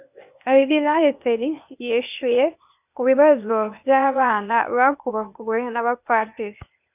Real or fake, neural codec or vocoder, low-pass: fake; codec, 16 kHz, 0.8 kbps, ZipCodec; 3.6 kHz